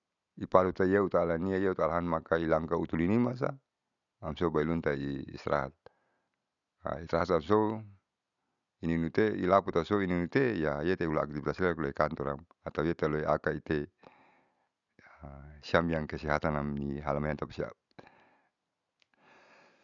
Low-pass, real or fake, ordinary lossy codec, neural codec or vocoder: 7.2 kHz; real; none; none